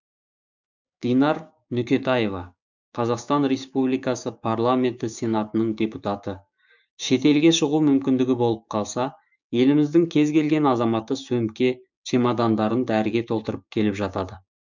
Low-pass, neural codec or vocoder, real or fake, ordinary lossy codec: 7.2 kHz; codec, 16 kHz, 6 kbps, DAC; fake; none